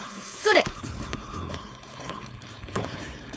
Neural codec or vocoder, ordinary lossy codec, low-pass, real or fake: codec, 16 kHz, 4.8 kbps, FACodec; none; none; fake